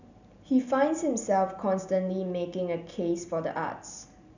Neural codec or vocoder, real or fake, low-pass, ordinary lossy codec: none; real; 7.2 kHz; none